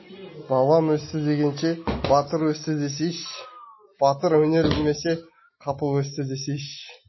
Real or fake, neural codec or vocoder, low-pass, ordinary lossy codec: real; none; 7.2 kHz; MP3, 24 kbps